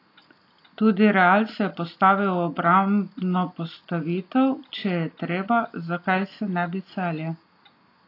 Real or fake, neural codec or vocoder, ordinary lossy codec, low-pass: real; none; AAC, 32 kbps; 5.4 kHz